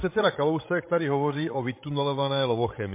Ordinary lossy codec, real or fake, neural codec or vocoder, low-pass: MP3, 24 kbps; fake; codec, 16 kHz, 16 kbps, FreqCodec, larger model; 3.6 kHz